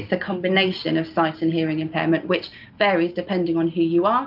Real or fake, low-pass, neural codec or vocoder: real; 5.4 kHz; none